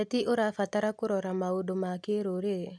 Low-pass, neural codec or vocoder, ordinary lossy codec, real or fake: none; none; none; real